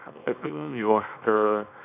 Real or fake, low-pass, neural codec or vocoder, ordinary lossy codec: fake; 3.6 kHz; codec, 24 kHz, 0.9 kbps, WavTokenizer, small release; none